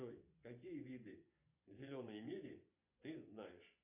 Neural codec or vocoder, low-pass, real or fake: vocoder, 24 kHz, 100 mel bands, Vocos; 3.6 kHz; fake